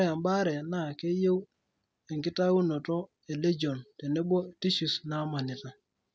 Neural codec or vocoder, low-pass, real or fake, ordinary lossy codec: none; none; real; none